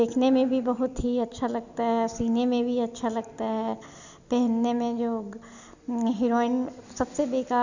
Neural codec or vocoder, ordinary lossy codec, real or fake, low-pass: none; none; real; 7.2 kHz